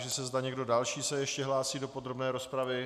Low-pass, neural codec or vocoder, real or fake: 14.4 kHz; none; real